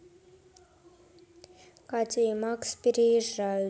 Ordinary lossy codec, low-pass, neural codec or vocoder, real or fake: none; none; none; real